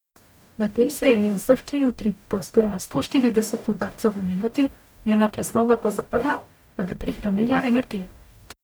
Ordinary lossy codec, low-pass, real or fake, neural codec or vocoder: none; none; fake; codec, 44.1 kHz, 0.9 kbps, DAC